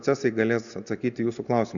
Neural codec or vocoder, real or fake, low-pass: none; real; 7.2 kHz